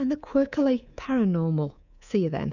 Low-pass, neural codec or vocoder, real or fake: 7.2 kHz; codec, 24 kHz, 3.1 kbps, DualCodec; fake